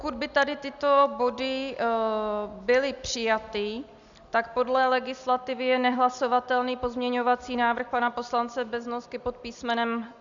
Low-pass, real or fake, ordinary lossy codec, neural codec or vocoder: 7.2 kHz; real; Opus, 64 kbps; none